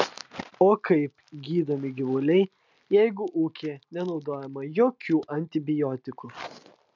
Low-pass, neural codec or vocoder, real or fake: 7.2 kHz; none; real